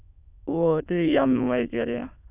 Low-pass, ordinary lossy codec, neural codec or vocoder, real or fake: 3.6 kHz; none; autoencoder, 22.05 kHz, a latent of 192 numbers a frame, VITS, trained on many speakers; fake